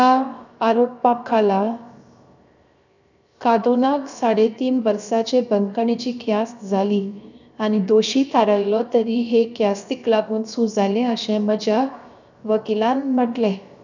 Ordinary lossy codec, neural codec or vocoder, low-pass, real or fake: none; codec, 16 kHz, 0.7 kbps, FocalCodec; 7.2 kHz; fake